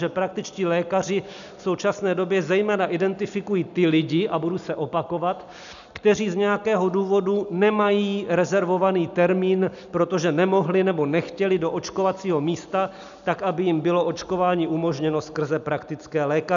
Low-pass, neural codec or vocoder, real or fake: 7.2 kHz; none; real